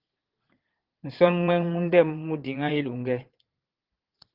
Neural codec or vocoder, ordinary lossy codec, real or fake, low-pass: vocoder, 22.05 kHz, 80 mel bands, Vocos; Opus, 16 kbps; fake; 5.4 kHz